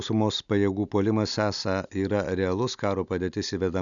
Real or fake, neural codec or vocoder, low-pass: real; none; 7.2 kHz